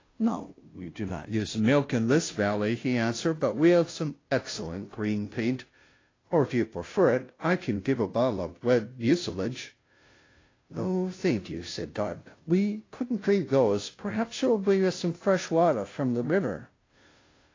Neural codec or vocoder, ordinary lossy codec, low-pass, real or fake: codec, 16 kHz, 0.5 kbps, FunCodec, trained on Chinese and English, 25 frames a second; AAC, 32 kbps; 7.2 kHz; fake